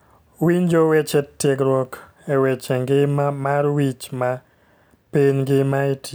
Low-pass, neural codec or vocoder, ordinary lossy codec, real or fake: none; none; none; real